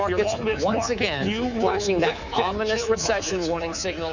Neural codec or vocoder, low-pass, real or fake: codec, 24 kHz, 3.1 kbps, DualCodec; 7.2 kHz; fake